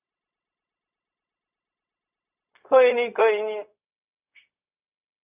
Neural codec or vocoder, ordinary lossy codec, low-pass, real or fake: codec, 16 kHz, 0.4 kbps, LongCat-Audio-Codec; none; 3.6 kHz; fake